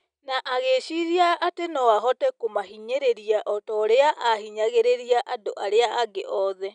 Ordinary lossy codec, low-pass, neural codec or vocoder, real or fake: none; 9.9 kHz; none; real